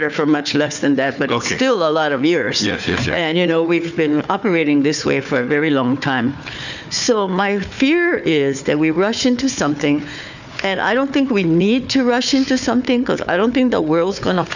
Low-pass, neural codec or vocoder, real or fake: 7.2 kHz; codec, 16 kHz, 4 kbps, FunCodec, trained on Chinese and English, 50 frames a second; fake